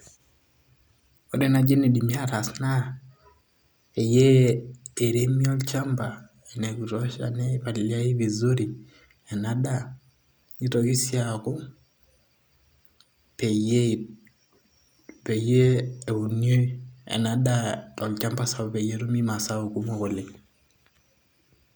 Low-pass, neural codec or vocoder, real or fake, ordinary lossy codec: none; none; real; none